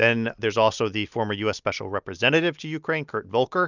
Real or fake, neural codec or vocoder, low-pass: real; none; 7.2 kHz